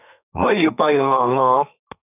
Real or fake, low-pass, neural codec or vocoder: fake; 3.6 kHz; codec, 32 kHz, 1.9 kbps, SNAC